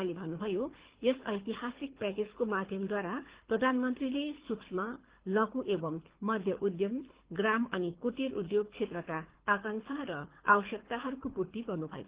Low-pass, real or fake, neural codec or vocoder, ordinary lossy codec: 3.6 kHz; fake; codec, 24 kHz, 6 kbps, HILCodec; Opus, 16 kbps